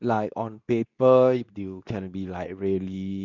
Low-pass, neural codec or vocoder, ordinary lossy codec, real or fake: 7.2 kHz; codec, 24 kHz, 0.9 kbps, WavTokenizer, medium speech release version 2; none; fake